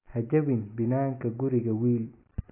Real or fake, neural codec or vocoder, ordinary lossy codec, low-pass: real; none; AAC, 24 kbps; 3.6 kHz